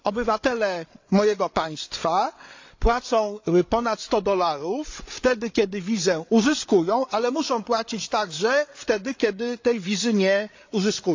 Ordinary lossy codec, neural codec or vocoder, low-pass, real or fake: AAC, 48 kbps; codec, 16 kHz, 8 kbps, FreqCodec, larger model; 7.2 kHz; fake